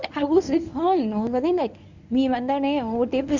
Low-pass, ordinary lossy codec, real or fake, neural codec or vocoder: 7.2 kHz; none; fake; codec, 24 kHz, 0.9 kbps, WavTokenizer, medium speech release version 1